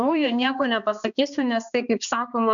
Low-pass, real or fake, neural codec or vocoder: 7.2 kHz; fake; codec, 16 kHz, 2 kbps, X-Codec, HuBERT features, trained on balanced general audio